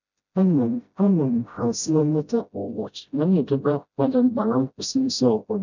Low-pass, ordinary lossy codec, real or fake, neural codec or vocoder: 7.2 kHz; none; fake; codec, 16 kHz, 0.5 kbps, FreqCodec, smaller model